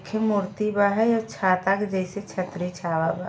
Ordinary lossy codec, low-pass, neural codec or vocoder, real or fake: none; none; none; real